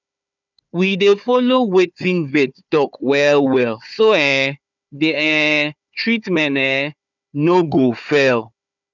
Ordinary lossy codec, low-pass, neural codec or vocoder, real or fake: none; 7.2 kHz; codec, 16 kHz, 4 kbps, FunCodec, trained on Chinese and English, 50 frames a second; fake